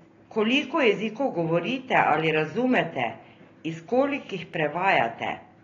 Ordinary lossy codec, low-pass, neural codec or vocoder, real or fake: AAC, 32 kbps; 7.2 kHz; none; real